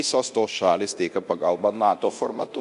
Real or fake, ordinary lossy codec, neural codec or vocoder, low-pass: fake; MP3, 64 kbps; codec, 24 kHz, 0.9 kbps, DualCodec; 10.8 kHz